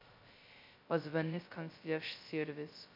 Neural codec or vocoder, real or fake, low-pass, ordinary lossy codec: codec, 16 kHz, 0.2 kbps, FocalCodec; fake; 5.4 kHz; MP3, 48 kbps